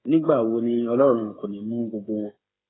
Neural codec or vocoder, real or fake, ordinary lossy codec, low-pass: codec, 16 kHz, 8 kbps, FreqCodec, smaller model; fake; AAC, 16 kbps; 7.2 kHz